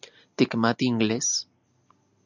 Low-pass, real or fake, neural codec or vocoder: 7.2 kHz; real; none